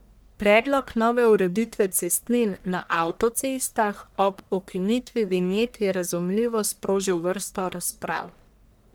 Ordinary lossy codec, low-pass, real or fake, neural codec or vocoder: none; none; fake; codec, 44.1 kHz, 1.7 kbps, Pupu-Codec